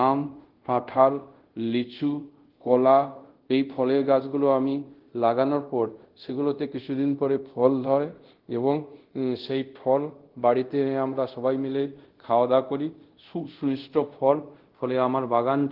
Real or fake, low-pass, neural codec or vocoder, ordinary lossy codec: fake; 5.4 kHz; codec, 24 kHz, 0.5 kbps, DualCodec; Opus, 16 kbps